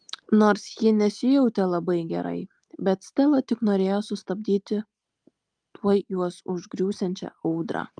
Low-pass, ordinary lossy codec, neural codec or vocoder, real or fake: 9.9 kHz; Opus, 32 kbps; none; real